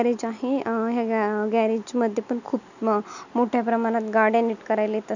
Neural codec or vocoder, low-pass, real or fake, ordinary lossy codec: none; 7.2 kHz; real; none